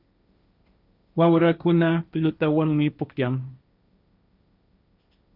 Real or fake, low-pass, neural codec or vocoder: fake; 5.4 kHz; codec, 16 kHz, 1.1 kbps, Voila-Tokenizer